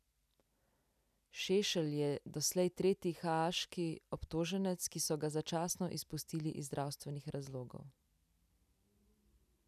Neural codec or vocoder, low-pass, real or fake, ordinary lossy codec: none; 14.4 kHz; real; none